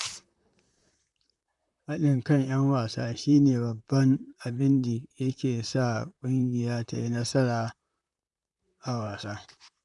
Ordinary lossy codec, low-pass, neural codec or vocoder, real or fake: none; 10.8 kHz; vocoder, 44.1 kHz, 128 mel bands, Pupu-Vocoder; fake